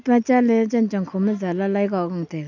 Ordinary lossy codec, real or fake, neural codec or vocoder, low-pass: none; real; none; 7.2 kHz